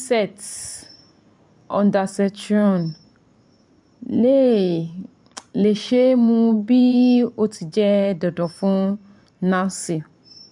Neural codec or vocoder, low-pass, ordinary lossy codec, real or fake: vocoder, 44.1 kHz, 128 mel bands every 512 samples, BigVGAN v2; 10.8 kHz; MP3, 64 kbps; fake